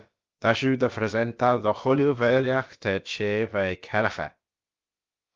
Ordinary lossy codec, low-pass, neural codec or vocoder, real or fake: Opus, 32 kbps; 7.2 kHz; codec, 16 kHz, about 1 kbps, DyCAST, with the encoder's durations; fake